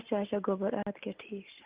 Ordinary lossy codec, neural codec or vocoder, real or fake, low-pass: Opus, 32 kbps; none; real; 3.6 kHz